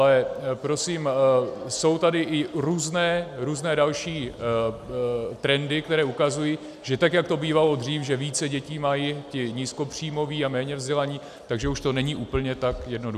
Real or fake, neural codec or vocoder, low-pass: real; none; 14.4 kHz